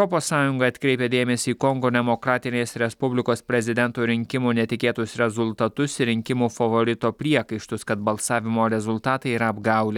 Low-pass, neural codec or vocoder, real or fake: 19.8 kHz; none; real